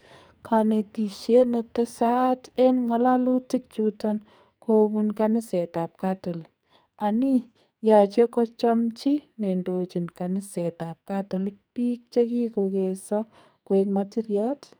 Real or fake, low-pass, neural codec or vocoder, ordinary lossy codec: fake; none; codec, 44.1 kHz, 2.6 kbps, SNAC; none